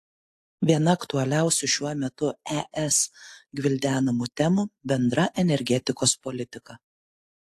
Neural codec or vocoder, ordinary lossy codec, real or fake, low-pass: none; AAC, 64 kbps; real; 14.4 kHz